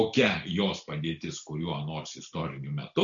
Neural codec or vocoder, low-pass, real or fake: none; 7.2 kHz; real